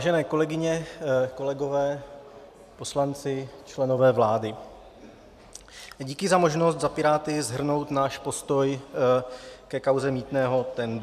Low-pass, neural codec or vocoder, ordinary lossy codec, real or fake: 14.4 kHz; none; MP3, 96 kbps; real